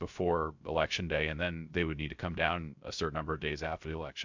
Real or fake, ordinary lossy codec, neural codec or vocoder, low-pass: fake; MP3, 64 kbps; codec, 16 kHz, 0.3 kbps, FocalCodec; 7.2 kHz